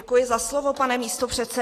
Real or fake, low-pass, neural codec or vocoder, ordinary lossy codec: real; 14.4 kHz; none; AAC, 48 kbps